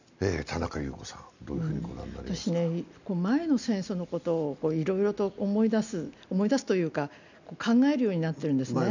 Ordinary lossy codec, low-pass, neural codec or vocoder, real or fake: none; 7.2 kHz; none; real